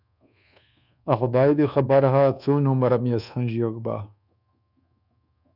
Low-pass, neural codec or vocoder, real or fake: 5.4 kHz; codec, 24 kHz, 1.2 kbps, DualCodec; fake